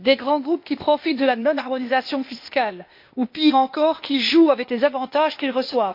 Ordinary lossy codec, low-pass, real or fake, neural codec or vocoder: MP3, 32 kbps; 5.4 kHz; fake; codec, 16 kHz, 0.8 kbps, ZipCodec